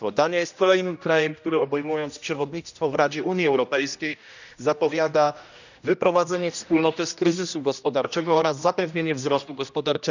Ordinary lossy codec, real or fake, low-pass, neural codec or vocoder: none; fake; 7.2 kHz; codec, 16 kHz, 1 kbps, X-Codec, HuBERT features, trained on general audio